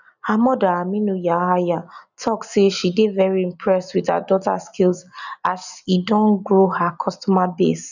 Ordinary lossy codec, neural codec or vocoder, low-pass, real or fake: none; none; 7.2 kHz; real